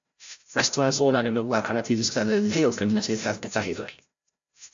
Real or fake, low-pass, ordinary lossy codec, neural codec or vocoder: fake; 7.2 kHz; AAC, 64 kbps; codec, 16 kHz, 0.5 kbps, FreqCodec, larger model